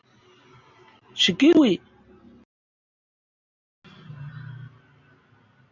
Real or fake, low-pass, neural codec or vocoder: real; 7.2 kHz; none